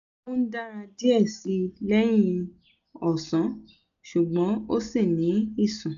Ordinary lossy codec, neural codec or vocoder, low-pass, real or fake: none; none; 7.2 kHz; real